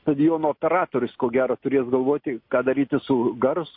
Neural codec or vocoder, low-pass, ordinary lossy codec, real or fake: none; 5.4 kHz; MP3, 32 kbps; real